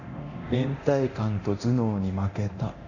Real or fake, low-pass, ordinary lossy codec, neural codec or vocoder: fake; 7.2 kHz; none; codec, 24 kHz, 0.9 kbps, DualCodec